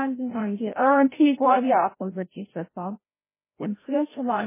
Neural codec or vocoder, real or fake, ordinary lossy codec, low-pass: codec, 16 kHz, 0.5 kbps, FreqCodec, larger model; fake; MP3, 16 kbps; 3.6 kHz